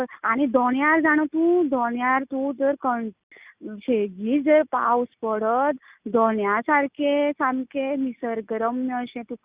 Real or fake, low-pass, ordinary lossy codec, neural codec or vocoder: real; 3.6 kHz; Opus, 64 kbps; none